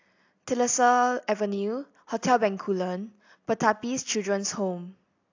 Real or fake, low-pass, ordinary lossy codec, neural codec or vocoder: real; 7.2 kHz; AAC, 48 kbps; none